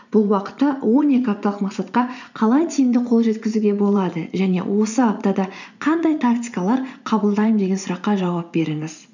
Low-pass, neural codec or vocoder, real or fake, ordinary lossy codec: 7.2 kHz; vocoder, 44.1 kHz, 80 mel bands, Vocos; fake; none